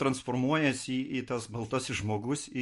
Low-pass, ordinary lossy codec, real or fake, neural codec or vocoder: 14.4 kHz; MP3, 48 kbps; real; none